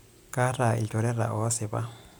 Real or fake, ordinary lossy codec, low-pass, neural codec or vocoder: real; none; none; none